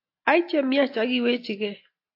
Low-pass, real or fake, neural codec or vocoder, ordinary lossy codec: 5.4 kHz; real; none; AAC, 32 kbps